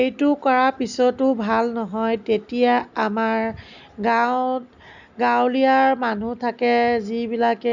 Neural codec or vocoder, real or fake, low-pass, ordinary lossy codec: none; real; 7.2 kHz; none